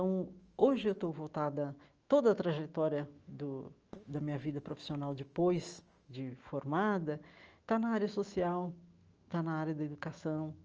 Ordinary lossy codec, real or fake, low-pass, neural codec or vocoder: Opus, 24 kbps; fake; 7.2 kHz; autoencoder, 48 kHz, 128 numbers a frame, DAC-VAE, trained on Japanese speech